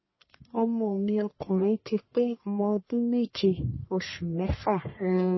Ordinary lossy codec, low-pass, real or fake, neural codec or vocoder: MP3, 24 kbps; 7.2 kHz; fake; codec, 44.1 kHz, 1.7 kbps, Pupu-Codec